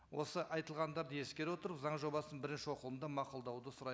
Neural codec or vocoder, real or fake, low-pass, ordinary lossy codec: none; real; none; none